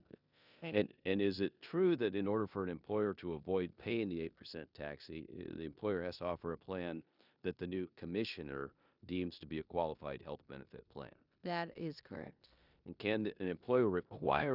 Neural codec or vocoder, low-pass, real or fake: codec, 16 kHz in and 24 kHz out, 0.9 kbps, LongCat-Audio-Codec, four codebook decoder; 5.4 kHz; fake